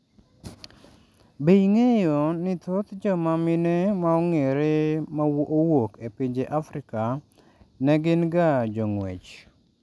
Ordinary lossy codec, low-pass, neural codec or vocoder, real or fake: none; none; none; real